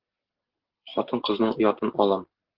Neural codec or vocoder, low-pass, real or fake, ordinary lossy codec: none; 5.4 kHz; real; Opus, 16 kbps